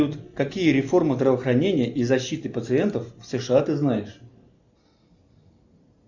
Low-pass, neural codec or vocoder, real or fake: 7.2 kHz; none; real